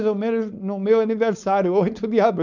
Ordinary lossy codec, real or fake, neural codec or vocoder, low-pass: none; fake; codec, 16 kHz, 4.8 kbps, FACodec; 7.2 kHz